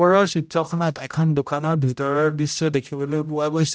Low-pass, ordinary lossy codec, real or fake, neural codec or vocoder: none; none; fake; codec, 16 kHz, 0.5 kbps, X-Codec, HuBERT features, trained on general audio